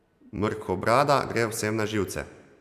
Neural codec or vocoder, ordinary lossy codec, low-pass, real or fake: none; none; 14.4 kHz; real